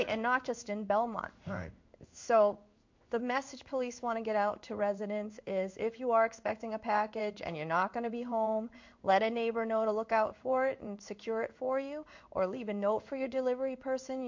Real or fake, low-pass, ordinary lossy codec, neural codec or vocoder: fake; 7.2 kHz; MP3, 48 kbps; codec, 16 kHz in and 24 kHz out, 1 kbps, XY-Tokenizer